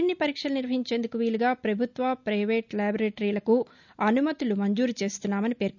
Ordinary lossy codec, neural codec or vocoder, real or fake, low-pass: none; none; real; 7.2 kHz